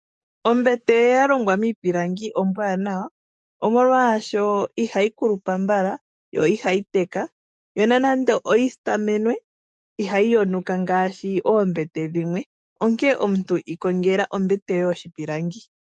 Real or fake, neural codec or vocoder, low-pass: fake; codec, 44.1 kHz, 7.8 kbps, DAC; 10.8 kHz